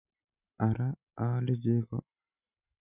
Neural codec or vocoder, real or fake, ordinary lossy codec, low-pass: none; real; none; 3.6 kHz